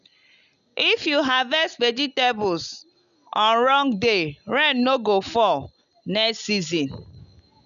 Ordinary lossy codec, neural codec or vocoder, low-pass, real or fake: none; none; 7.2 kHz; real